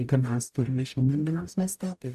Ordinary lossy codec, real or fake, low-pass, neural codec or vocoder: AAC, 96 kbps; fake; 14.4 kHz; codec, 44.1 kHz, 0.9 kbps, DAC